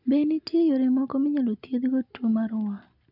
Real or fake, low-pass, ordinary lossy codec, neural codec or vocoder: real; 5.4 kHz; none; none